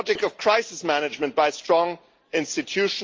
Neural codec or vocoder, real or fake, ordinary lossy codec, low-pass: none; real; Opus, 24 kbps; 7.2 kHz